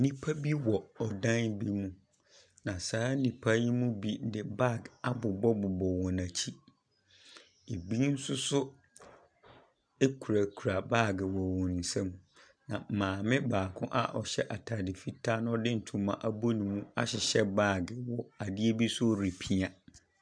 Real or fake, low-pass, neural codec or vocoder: real; 9.9 kHz; none